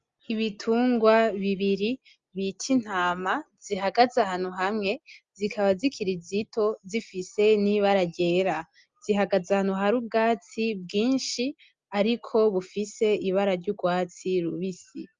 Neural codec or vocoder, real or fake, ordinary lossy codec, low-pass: none; real; Opus, 32 kbps; 7.2 kHz